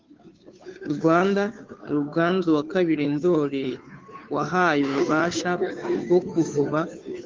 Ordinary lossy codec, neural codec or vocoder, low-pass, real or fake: Opus, 32 kbps; codec, 16 kHz, 2 kbps, FunCodec, trained on Chinese and English, 25 frames a second; 7.2 kHz; fake